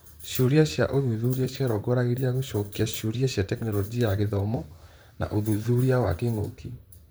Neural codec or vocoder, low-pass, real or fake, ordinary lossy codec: vocoder, 44.1 kHz, 128 mel bands, Pupu-Vocoder; none; fake; none